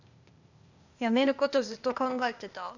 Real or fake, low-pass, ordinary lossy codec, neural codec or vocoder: fake; 7.2 kHz; none; codec, 16 kHz, 0.8 kbps, ZipCodec